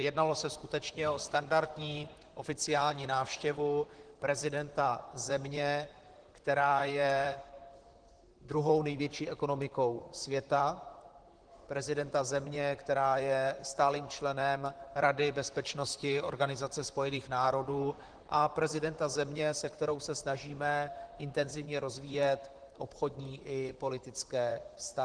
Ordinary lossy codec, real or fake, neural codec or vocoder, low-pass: Opus, 16 kbps; fake; vocoder, 44.1 kHz, 128 mel bands, Pupu-Vocoder; 9.9 kHz